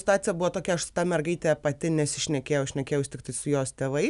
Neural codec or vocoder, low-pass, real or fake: none; 10.8 kHz; real